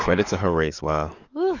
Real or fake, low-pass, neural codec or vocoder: fake; 7.2 kHz; codec, 44.1 kHz, 7.8 kbps, DAC